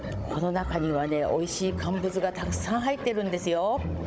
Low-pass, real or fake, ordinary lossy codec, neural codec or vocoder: none; fake; none; codec, 16 kHz, 16 kbps, FunCodec, trained on Chinese and English, 50 frames a second